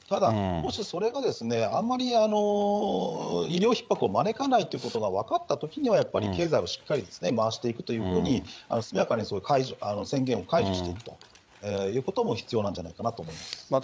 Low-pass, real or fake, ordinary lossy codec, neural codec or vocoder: none; fake; none; codec, 16 kHz, 8 kbps, FreqCodec, larger model